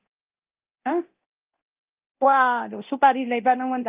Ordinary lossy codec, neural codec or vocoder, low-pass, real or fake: Opus, 32 kbps; codec, 24 kHz, 0.9 kbps, DualCodec; 3.6 kHz; fake